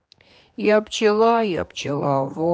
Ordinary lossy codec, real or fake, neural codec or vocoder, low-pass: none; fake; codec, 16 kHz, 2 kbps, X-Codec, HuBERT features, trained on general audio; none